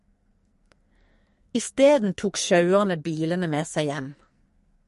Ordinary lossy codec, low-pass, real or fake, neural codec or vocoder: MP3, 48 kbps; 14.4 kHz; fake; codec, 44.1 kHz, 2.6 kbps, SNAC